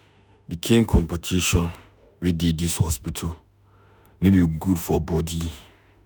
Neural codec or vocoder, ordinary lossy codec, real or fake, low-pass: autoencoder, 48 kHz, 32 numbers a frame, DAC-VAE, trained on Japanese speech; none; fake; none